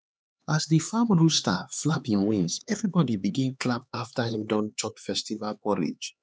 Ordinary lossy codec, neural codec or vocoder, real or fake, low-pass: none; codec, 16 kHz, 4 kbps, X-Codec, HuBERT features, trained on LibriSpeech; fake; none